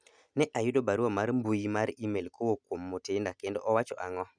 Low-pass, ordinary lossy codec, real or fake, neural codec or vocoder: 9.9 kHz; none; real; none